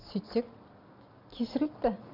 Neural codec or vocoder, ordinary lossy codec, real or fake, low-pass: none; none; real; 5.4 kHz